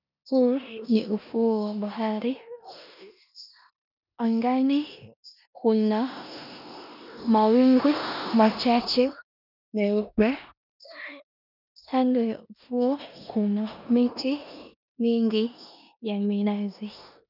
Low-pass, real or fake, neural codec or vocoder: 5.4 kHz; fake; codec, 16 kHz in and 24 kHz out, 0.9 kbps, LongCat-Audio-Codec, four codebook decoder